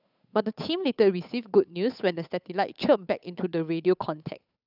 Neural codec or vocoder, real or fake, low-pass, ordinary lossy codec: codec, 16 kHz, 8 kbps, FunCodec, trained on Chinese and English, 25 frames a second; fake; 5.4 kHz; none